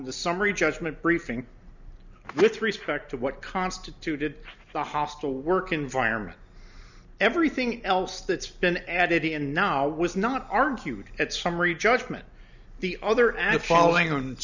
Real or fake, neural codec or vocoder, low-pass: real; none; 7.2 kHz